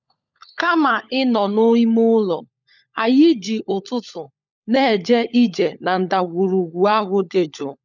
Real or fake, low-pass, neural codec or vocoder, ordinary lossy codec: fake; 7.2 kHz; codec, 16 kHz, 16 kbps, FunCodec, trained on LibriTTS, 50 frames a second; none